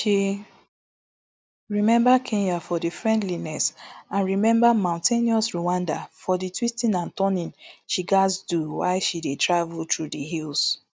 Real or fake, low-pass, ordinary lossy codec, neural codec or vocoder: real; none; none; none